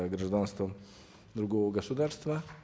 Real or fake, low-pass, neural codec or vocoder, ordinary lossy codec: real; none; none; none